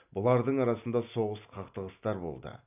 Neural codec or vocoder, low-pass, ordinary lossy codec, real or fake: none; 3.6 kHz; none; real